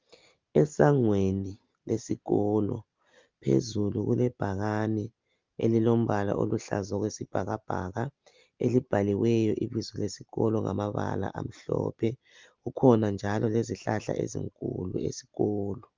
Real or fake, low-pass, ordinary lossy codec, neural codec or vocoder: real; 7.2 kHz; Opus, 24 kbps; none